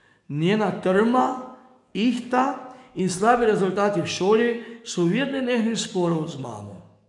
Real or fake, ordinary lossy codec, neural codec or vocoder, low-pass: fake; none; codec, 44.1 kHz, 7.8 kbps, DAC; 10.8 kHz